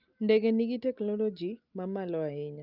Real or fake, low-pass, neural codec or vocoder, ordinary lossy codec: real; 5.4 kHz; none; Opus, 24 kbps